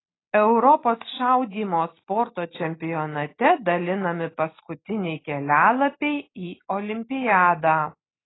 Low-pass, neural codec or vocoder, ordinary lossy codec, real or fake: 7.2 kHz; none; AAC, 16 kbps; real